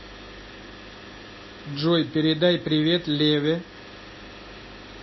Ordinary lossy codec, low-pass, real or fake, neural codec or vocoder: MP3, 24 kbps; 7.2 kHz; real; none